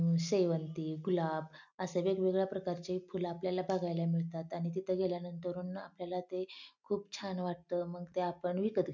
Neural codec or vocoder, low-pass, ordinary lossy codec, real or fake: none; 7.2 kHz; none; real